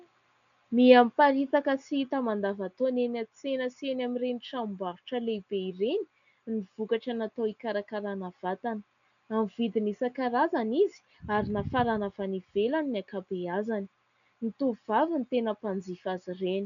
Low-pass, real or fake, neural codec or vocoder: 7.2 kHz; real; none